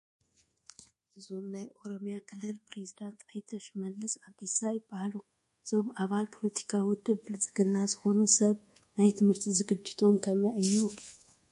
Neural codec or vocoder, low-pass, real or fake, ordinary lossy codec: codec, 24 kHz, 1.2 kbps, DualCodec; 10.8 kHz; fake; MP3, 48 kbps